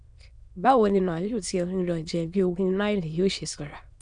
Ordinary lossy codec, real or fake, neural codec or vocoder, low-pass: none; fake; autoencoder, 22.05 kHz, a latent of 192 numbers a frame, VITS, trained on many speakers; 9.9 kHz